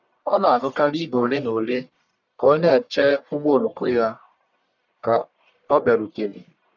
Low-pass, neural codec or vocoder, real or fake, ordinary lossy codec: 7.2 kHz; codec, 44.1 kHz, 1.7 kbps, Pupu-Codec; fake; none